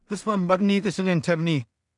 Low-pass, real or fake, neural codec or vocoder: 10.8 kHz; fake; codec, 16 kHz in and 24 kHz out, 0.4 kbps, LongCat-Audio-Codec, two codebook decoder